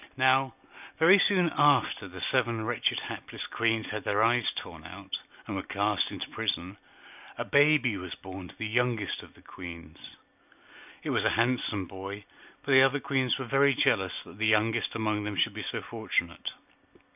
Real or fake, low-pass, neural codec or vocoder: real; 3.6 kHz; none